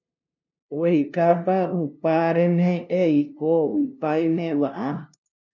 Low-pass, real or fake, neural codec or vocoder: 7.2 kHz; fake; codec, 16 kHz, 0.5 kbps, FunCodec, trained on LibriTTS, 25 frames a second